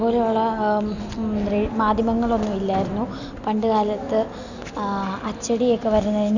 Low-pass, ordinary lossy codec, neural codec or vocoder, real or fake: 7.2 kHz; none; none; real